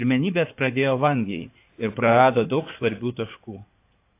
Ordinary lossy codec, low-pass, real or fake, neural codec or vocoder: AAC, 24 kbps; 3.6 kHz; fake; codec, 16 kHz in and 24 kHz out, 2.2 kbps, FireRedTTS-2 codec